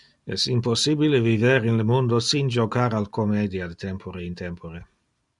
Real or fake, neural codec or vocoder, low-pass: real; none; 10.8 kHz